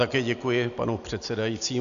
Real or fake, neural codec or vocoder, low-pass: real; none; 7.2 kHz